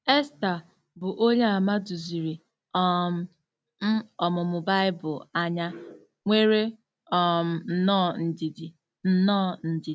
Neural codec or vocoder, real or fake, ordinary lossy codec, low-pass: none; real; none; none